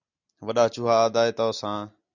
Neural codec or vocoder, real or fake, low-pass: none; real; 7.2 kHz